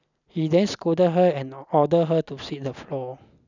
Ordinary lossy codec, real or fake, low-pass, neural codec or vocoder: none; real; 7.2 kHz; none